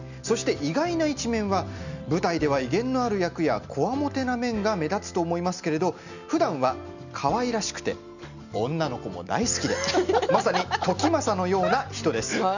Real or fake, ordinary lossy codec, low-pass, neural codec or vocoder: real; none; 7.2 kHz; none